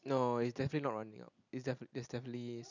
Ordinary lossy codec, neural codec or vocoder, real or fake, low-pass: none; none; real; 7.2 kHz